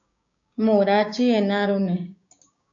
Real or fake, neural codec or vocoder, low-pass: fake; codec, 16 kHz, 6 kbps, DAC; 7.2 kHz